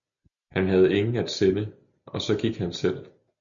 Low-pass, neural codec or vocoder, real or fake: 7.2 kHz; none; real